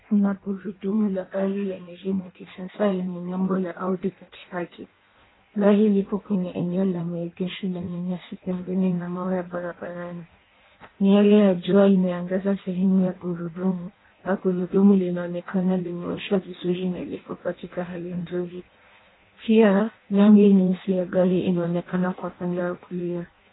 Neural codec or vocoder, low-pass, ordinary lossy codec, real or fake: codec, 16 kHz in and 24 kHz out, 0.6 kbps, FireRedTTS-2 codec; 7.2 kHz; AAC, 16 kbps; fake